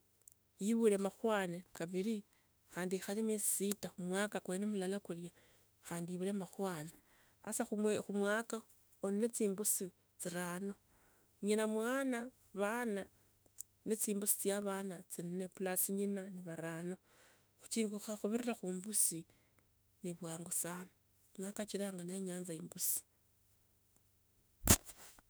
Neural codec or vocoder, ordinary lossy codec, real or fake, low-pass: autoencoder, 48 kHz, 32 numbers a frame, DAC-VAE, trained on Japanese speech; none; fake; none